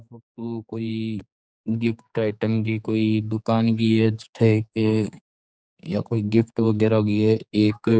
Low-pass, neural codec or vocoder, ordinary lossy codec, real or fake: none; codec, 16 kHz, 4 kbps, X-Codec, HuBERT features, trained on general audio; none; fake